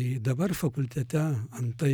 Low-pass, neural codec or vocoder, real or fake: 19.8 kHz; none; real